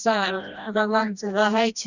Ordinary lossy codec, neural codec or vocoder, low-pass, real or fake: none; codec, 16 kHz, 1 kbps, FreqCodec, smaller model; 7.2 kHz; fake